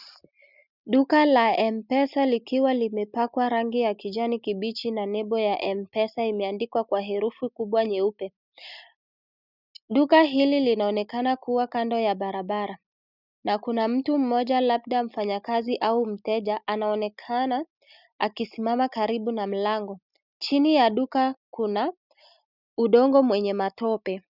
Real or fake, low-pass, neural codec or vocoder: real; 5.4 kHz; none